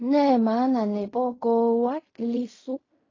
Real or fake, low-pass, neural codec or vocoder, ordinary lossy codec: fake; 7.2 kHz; codec, 16 kHz in and 24 kHz out, 0.4 kbps, LongCat-Audio-Codec, fine tuned four codebook decoder; AAC, 32 kbps